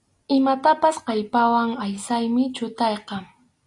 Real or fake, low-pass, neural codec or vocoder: real; 10.8 kHz; none